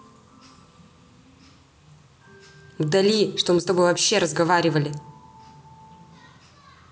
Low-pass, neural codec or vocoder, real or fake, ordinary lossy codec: none; none; real; none